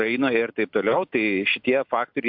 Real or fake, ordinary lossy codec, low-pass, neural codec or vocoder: real; MP3, 48 kbps; 5.4 kHz; none